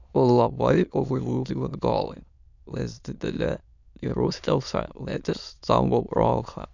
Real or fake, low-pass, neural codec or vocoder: fake; 7.2 kHz; autoencoder, 22.05 kHz, a latent of 192 numbers a frame, VITS, trained on many speakers